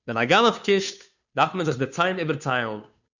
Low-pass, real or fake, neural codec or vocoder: 7.2 kHz; fake; codec, 16 kHz, 2 kbps, FunCodec, trained on Chinese and English, 25 frames a second